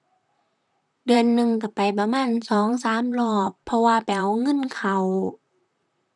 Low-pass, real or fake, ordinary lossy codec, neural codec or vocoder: 10.8 kHz; fake; none; vocoder, 44.1 kHz, 128 mel bands, Pupu-Vocoder